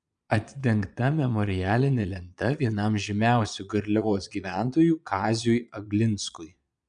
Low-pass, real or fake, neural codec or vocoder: 9.9 kHz; fake; vocoder, 22.05 kHz, 80 mel bands, Vocos